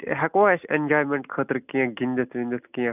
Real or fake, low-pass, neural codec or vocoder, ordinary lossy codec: real; 3.6 kHz; none; none